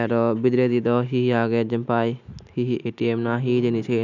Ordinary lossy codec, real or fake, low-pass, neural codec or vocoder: none; real; 7.2 kHz; none